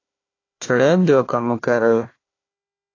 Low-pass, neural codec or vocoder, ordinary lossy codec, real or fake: 7.2 kHz; codec, 16 kHz, 1 kbps, FunCodec, trained on Chinese and English, 50 frames a second; AAC, 32 kbps; fake